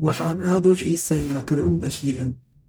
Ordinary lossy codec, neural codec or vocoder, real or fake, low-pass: none; codec, 44.1 kHz, 0.9 kbps, DAC; fake; none